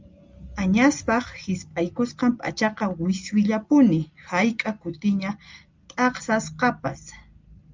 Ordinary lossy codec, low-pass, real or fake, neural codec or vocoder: Opus, 32 kbps; 7.2 kHz; real; none